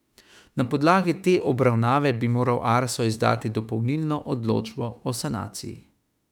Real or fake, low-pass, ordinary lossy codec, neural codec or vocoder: fake; 19.8 kHz; none; autoencoder, 48 kHz, 32 numbers a frame, DAC-VAE, trained on Japanese speech